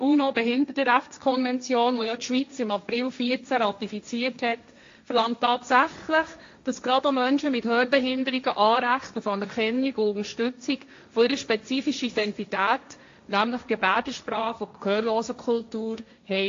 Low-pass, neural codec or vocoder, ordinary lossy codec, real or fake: 7.2 kHz; codec, 16 kHz, 1.1 kbps, Voila-Tokenizer; AAC, 48 kbps; fake